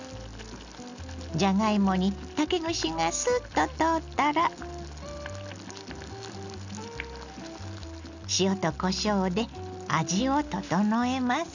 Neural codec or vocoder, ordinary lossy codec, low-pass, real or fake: none; none; 7.2 kHz; real